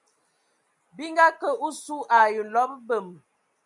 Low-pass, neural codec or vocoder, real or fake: 10.8 kHz; none; real